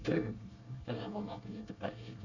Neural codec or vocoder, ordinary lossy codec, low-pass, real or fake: codec, 24 kHz, 1 kbps, SNAC; none; 7.2 kHz; fake